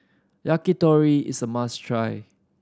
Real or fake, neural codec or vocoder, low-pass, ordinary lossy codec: real; none; none; none